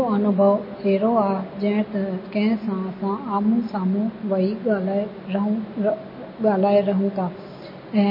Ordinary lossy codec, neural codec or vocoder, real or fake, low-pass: MP3, 24 kbps; none; real; 5.4 kHz